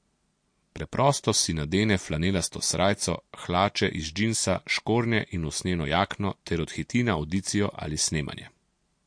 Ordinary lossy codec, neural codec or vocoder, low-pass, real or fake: MP3, 48 kbps; none; 9.9 kHz; real